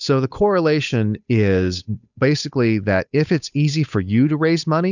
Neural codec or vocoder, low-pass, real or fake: codec, 16 kHz, 8 kbps, FunCodec, trained on Chinese and English, 25 frames a second; 7.2 kHz; fake